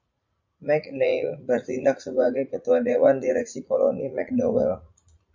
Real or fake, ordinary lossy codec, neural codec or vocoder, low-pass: fake; MP3, 48 kbps; vocoder, 44.1 kHz, 80 mel bands, Vocos; 7.2 kHz